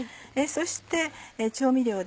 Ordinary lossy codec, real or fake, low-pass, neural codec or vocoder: none; real; none; none